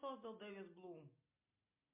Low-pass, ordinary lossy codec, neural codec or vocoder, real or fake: 3.6 kHz; MP3, 32 kbps; none; real